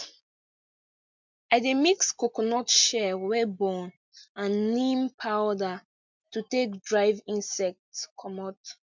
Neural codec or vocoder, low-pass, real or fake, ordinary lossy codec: none; 7.2 kHz; real; MP3, 64 kbps